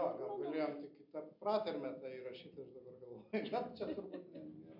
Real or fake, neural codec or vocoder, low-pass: real; none; 5.4 kHz